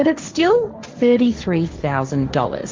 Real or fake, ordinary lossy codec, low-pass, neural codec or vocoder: fake; Opus, 32 kbps; 7.2 kHz; codec, 16 kHz, 1.1 kbps, Voila-Tokenizer